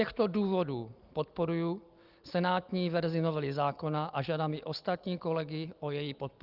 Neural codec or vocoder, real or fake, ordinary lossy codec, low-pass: none; real; Opus, 24 kbps; 5.4 kHz